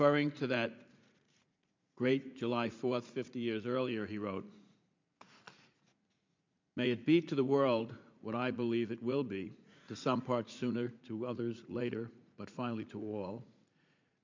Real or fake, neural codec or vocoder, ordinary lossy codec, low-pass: fake; vocoder, 44.1 kHz, 80 mel bands, Vocos; MP3, 64 kbps; 7.2 kHz